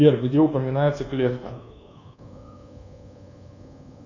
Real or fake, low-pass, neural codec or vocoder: fake; 7.2 kHz; codec, 24 kHz, 1.2 kbps, DualCodec